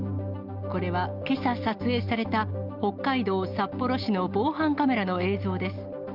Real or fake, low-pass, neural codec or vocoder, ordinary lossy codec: real; 5.4 kHz; none; Opus, 24 kbps